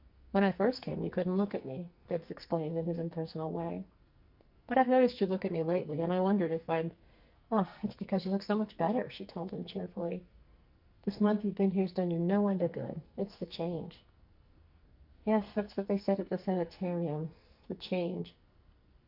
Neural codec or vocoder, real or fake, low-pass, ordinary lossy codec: codec, 44.1 kHz, 2.6 kbps, SNAC; fake; 5.4 kHz; Opus, 64 kbps